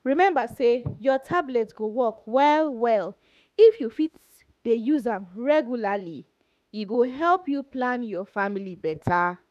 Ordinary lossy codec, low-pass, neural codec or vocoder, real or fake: none; 14.4 kHz; autoencoder, 48 kHz, 32 numbers a frame, DAC-VAE, trained on Japanese speech; fake